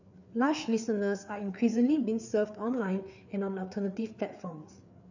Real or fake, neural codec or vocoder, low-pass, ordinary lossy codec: fake; codec, 16 kHz, 4 kbps, FreqCodec, larger model; 7.2 kHz; none